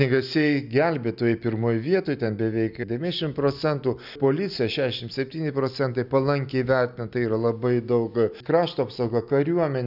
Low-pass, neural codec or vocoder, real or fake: 5.4 kHz; none; real